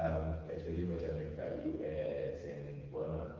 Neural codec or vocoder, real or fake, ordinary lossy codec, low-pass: codec, 16 kHz, 4 kbps, FreqCodec, smaller model; fake; Opus, 32 kbps; 7.2 kHz